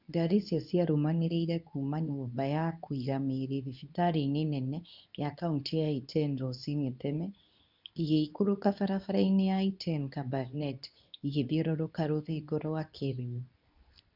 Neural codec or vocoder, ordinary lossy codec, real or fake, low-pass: codec, 24 kHz, 0.9 kbps, WavTokenizer, medium speech release version 2; none; fake; 5.4 kHz